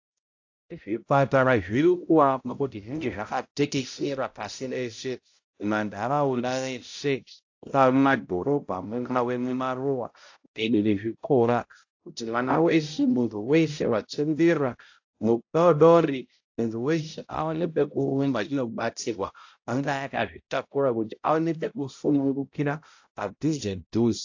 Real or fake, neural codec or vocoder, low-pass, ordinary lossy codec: fake; codec, 16 kHz, 0.5 kbps, X-Codec, HuBERT features, trained on balanced general audio; 7.2 kHz; AAC, 48 kbps